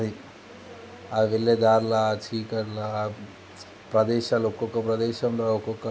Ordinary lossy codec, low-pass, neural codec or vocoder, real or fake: none; none; none; real